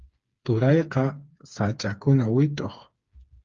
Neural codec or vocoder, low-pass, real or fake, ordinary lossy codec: codec, 16 kHz, 4 kbps, FreqCodec, smaller model; 7.2 kHz; fake; Opus, 32 kbps